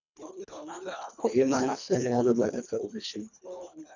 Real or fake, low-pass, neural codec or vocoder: fake; 7.2 kHz; codec, 24 kHz, 1.5 kbps, HILCodec